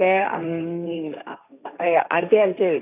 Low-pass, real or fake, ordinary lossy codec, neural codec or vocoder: 3.6 kHz; fake; none; codec, 16 kHz, 1.1 kbps, Voila-Tokenizer